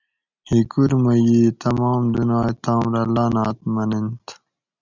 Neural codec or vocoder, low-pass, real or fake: none; 7.2 kHz; real